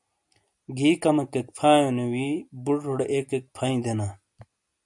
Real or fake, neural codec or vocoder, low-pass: real; none; 10.8 kHz